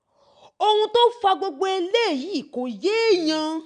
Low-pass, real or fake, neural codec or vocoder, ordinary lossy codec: 9.9 kHz; real; none; none